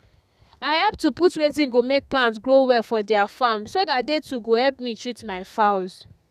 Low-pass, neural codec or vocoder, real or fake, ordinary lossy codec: 14.4 kHz; codec, 32 kHz, 1.9 kbps, SNAC; fake; none